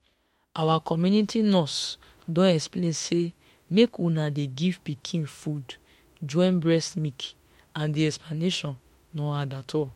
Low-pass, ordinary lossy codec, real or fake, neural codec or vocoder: 19.8 kHz; MP3, 64 kbps; fake; autoencoder, 48 kHz, 32 numbers a frame, DAC-VAE, trained on Japanese speech